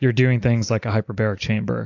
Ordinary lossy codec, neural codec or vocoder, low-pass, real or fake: AAC, 48 kbps; none; 7.2 kHz; real